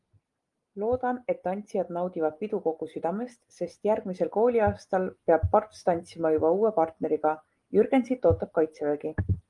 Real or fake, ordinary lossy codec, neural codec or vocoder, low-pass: real; Opus, 32 kbps; none; 10.8 kHz